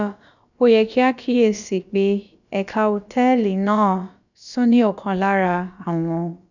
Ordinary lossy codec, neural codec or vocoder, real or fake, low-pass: none; codec, 16 kHz, about 1 kbps, DyCAST, with the encoder's durations; fake; 7.2 kHz